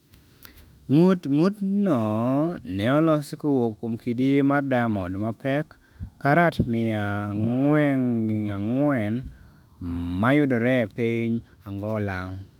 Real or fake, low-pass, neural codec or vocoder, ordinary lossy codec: fake; 19.8 kHz; autoencoder, 48 kHz, 32 numbers a frame, DAC-VAE, trained on Japanese speech; none